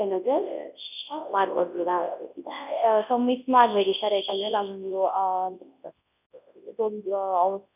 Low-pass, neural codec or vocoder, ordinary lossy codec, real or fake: 3.6 kHz; codec, 24 kHz, 0.9 kbps, WavTokenizer, large speech release; none; fake